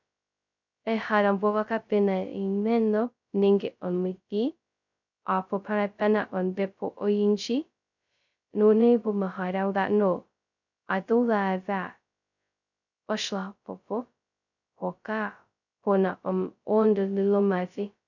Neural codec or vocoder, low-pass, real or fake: codec, 16 kHz, 0.2 kbps, FocalCodec; 7.2 kHz; fake